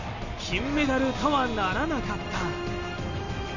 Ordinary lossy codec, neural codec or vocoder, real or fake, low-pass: none; none; real; 7.2 kHz